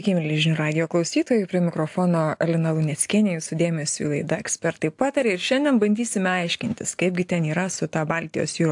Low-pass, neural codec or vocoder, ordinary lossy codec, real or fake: 10.8 kHz; none; AAC, 64 kbps; real